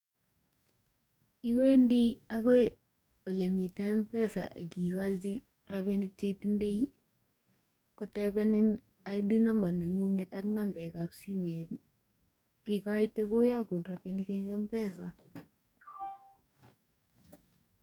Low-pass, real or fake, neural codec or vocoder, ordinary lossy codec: 19.8 kHz; fake; codec, 44.1 kHz, 2.6 kbps, DAC; none